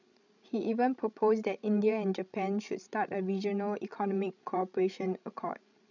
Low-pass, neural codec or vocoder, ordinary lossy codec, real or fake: 7.2 kHz; codec, 16 kHz, 16 kbps, FreqCodec, larger model; none; fake